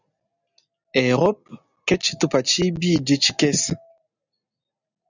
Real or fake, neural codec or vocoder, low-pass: real; none; 7.2 kHz